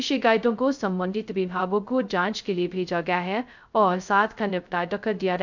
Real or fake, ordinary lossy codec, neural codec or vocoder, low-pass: fake; none; codec, 16 kHz, 0.2 kbps, FocalCodec; 7.2 kHz